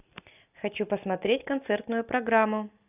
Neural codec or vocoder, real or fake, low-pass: none; real; 3.6 kHz